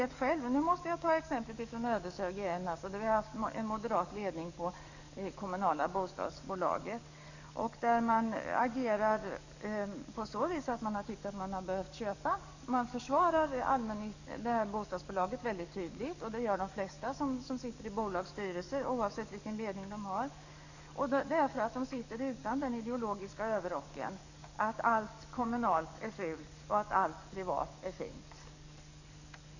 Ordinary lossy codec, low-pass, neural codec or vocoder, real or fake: none; 7.2 kHz; codec, 44.1 kHz, 7.8 kbps, DAC; fake